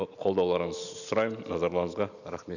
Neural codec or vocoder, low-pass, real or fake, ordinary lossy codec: none; 7.2 kHz; real; none